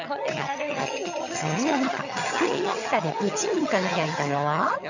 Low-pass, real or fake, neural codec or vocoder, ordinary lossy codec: 7.2 kHz; fake; vocoder, 22.05 kHz, 80 mel bands, HiFi-GAN; none